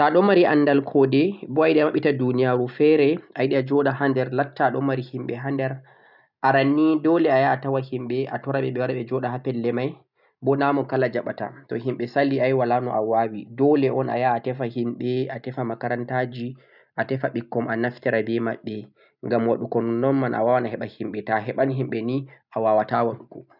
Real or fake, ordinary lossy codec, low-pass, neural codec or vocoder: real; none; 5.4 kHz; none